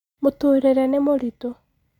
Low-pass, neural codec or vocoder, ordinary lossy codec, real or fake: 19.8 kHz; none; none; real